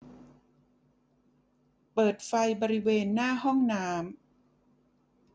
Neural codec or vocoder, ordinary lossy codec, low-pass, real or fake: none; none; none; real